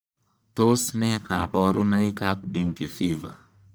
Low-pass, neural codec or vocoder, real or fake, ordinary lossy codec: none; codec, 44.1 kHz, 1.7 kbps, Pupu-Codec; fake; none